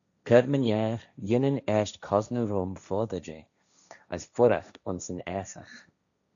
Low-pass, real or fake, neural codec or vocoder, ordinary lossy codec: 7.2 kHz; fake; codec, 16 kHz, 1.1 kbps, Voila-Tokenizer; MP3, 96 kbps